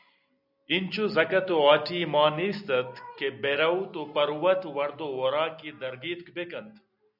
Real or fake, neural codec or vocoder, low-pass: real; none; 5.4 kHz